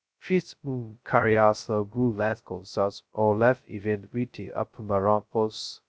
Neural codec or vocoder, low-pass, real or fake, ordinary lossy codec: codec, 16 kHz, 0.2 kbps, FocalCodec; none; fake; none